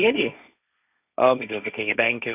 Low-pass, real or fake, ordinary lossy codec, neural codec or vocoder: 3.6 kHz; fake; none; codec, 16 kHz, 1.1 kbps, Voila-Tokenizer